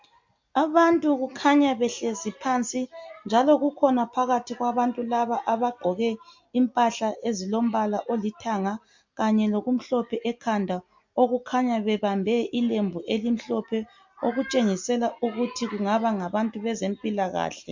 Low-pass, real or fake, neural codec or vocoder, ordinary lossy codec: 7.2 kHz; real; none; MP3, 48 kbps